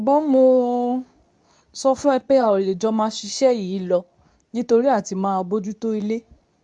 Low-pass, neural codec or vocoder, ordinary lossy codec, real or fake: 10.8 kHz; codec, 24 kHz, 0.9 kbps, WavTokenizer, medium speech release version 2; none; fake